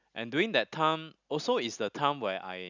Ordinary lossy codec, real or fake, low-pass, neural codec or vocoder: none; real; 7.2 kHz; none